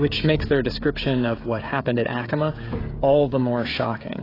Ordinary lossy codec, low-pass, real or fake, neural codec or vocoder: AAC, 24 kbps; 5.4 kHz; fake; codec, 16 kHz, 16 kbps, FreqCodec, smaller model